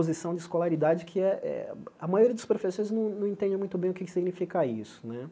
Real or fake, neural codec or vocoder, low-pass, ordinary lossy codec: real; none; none; none